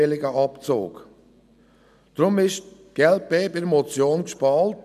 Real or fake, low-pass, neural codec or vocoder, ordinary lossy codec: real; 14.4 kHz; none; none